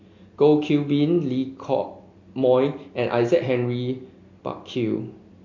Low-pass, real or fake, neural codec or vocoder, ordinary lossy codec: 7.2 kHz; real; none; AAC, 48 kbps